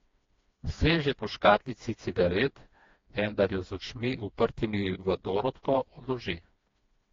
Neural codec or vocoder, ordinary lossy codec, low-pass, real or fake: codec, 16 kHz, 2 kbps, FreqCodec, smaller model; AAC, 32 kbps; 7.2 kHz; fake